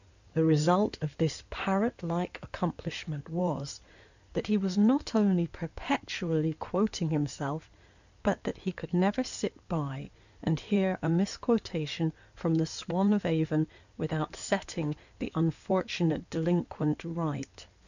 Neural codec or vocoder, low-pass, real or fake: codec, 16 kHz in and 24 kHz out, 2.2 kbps, FireRedTTS-2 codec; 7.2 kHz; fake